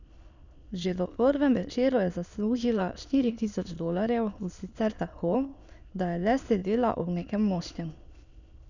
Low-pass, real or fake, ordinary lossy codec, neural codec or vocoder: 7.2 kHz; fake; none; autoencoder, 22.05 kHz, a latent of 192 numbers a frame, VITS, trained on many speakers